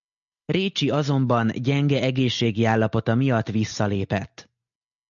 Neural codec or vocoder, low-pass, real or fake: none; 7.2 kHz; real